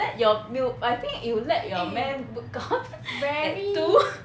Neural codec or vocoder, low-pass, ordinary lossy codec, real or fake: none; none; none; real